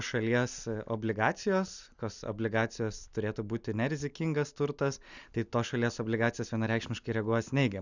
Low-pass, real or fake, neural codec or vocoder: 7.2 kHz; real; none